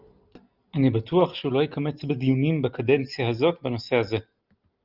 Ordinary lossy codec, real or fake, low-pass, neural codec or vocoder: Opus, 24 kbps; real; 5.4 kHz; none